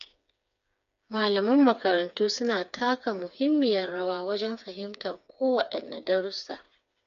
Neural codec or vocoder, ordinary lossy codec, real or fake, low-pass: codec, 16 kHz, 4 kbps, FreqCodec, smaller model; none; fake; 7.2 kHz